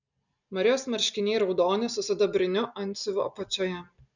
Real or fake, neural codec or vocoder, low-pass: real; none; 7.2 kHz